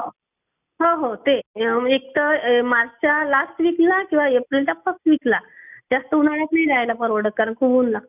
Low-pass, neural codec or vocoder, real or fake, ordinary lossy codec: 3.6 kHz; none; real; none